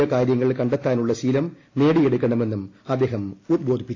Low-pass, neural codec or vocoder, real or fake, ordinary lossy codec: 7.2 kHz; none; real; AAC, 32 kbps